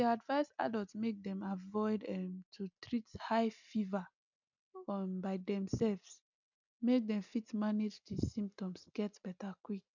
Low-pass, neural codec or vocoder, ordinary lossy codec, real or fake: 7.2 kHz; none; none; real